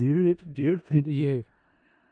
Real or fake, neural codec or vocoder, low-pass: fake; codec, 16 kHz in and 24 kHz out, 0.4 kbps, LongCat-Audio-Codec, four codebook decoder; 9.9 kHz